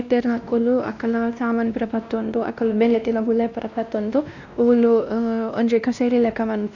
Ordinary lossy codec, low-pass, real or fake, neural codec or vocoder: none; 7.2 kHz; fake; codec, 16 kHz, 1 kbps, X-Codec, WavLM features, trained on Multilingual LibriSpeech